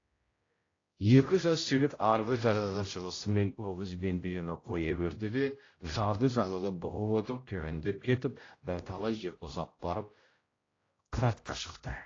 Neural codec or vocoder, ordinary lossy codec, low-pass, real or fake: codec, 16 kHz, 0.5 kbps, X-Codec, HuBERT features, trained on general audio; AAC, 32 kbps; 7.2 kHz; fake